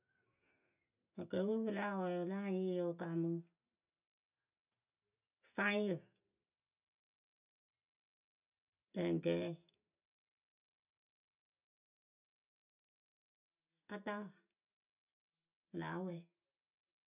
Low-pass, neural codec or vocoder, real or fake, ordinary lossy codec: 3.6 kHz; none; real; none